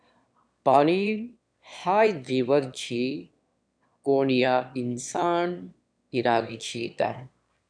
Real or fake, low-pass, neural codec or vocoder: fake; 9.9 kHz; autoencoder, 22.05 kHz, a latent of 192 numbers a frame, VITS, trained on one speaker